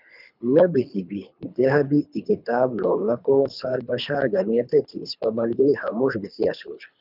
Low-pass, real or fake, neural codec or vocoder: 5.4 kHz; fake; codec, 24 kHz, 3 kbps, HILCodec